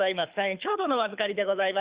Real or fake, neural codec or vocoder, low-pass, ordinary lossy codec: fake; codec, 24 kHz, 6 kbps, HILCodec; 3.6 kHz; Opus, 64 kbps